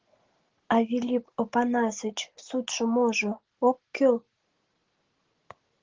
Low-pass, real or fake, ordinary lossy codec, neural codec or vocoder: 7.2 kHz; real; Opus, 16 kbps; none